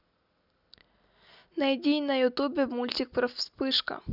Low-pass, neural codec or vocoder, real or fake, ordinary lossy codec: 5.4 kHz; none; real; none